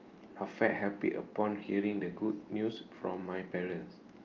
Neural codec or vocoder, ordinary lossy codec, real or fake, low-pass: none; Opus, 24 kbps; real; 7.2 kHz